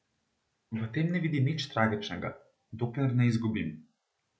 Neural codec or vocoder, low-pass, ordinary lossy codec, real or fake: none; none; none; real